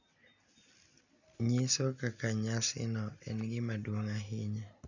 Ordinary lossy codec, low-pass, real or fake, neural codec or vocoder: none; 7.2 kHz; real; none